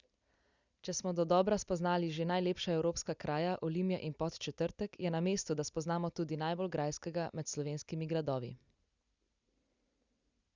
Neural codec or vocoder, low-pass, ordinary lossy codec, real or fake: none; 7.2 kHz; Opus, 64 kbps; real